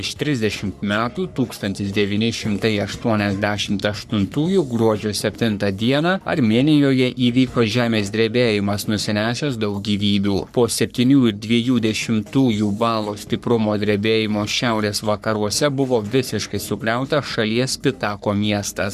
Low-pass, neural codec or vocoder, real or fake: 14.4 kHz; codec, 44.1 kHz, 3.4 kbps, Pupu-Codec; fake